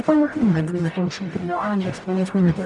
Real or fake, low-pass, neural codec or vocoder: fake; 10.8 kHz; codec, 44.1 kHz, 0.9 kbps, DAC